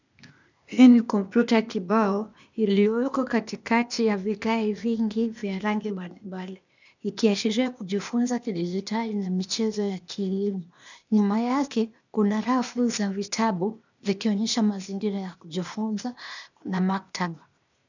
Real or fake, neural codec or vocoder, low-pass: fake; codec, 16 kHz, 0.8 kbps, ZipCodec; 7.2 kHz